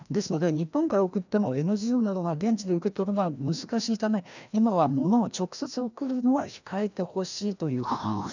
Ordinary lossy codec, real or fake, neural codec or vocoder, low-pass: none; fake; codec, 16 kHz, 1 kbps, FreqCodec, larger model; 7.2 kHz